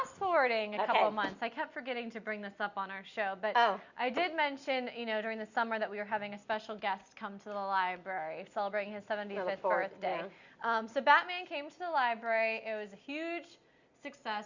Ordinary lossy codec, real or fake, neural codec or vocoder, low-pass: Opus, 64 kbps; real; none; 7.2 kHz